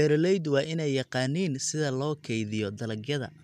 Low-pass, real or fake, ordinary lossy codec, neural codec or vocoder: 14.4 kHz; real; none; none